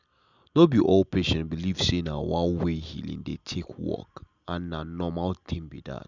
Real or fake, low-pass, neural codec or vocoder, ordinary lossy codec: real; 7.2 kHz; none; none